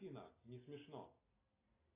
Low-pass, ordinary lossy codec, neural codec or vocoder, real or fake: 3.6 kHz; AAC, 16 kbps; none; real